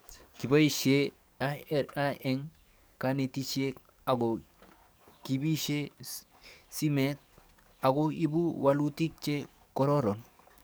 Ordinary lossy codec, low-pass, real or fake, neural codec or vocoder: none; none; fake; codec, 44.1 kHz, 7.8 kbps, DAC